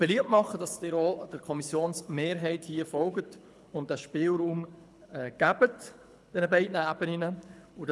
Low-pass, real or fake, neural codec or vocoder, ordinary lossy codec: none; fake; codec, 24 kHz, 6 kbps, HILCodec; none